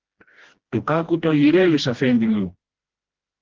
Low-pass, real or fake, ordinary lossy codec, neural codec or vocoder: 7.2 kHz; fake; Opus, 16 kbps; codec, 16 kHz, 1 kbps, FreqCodec, smaller model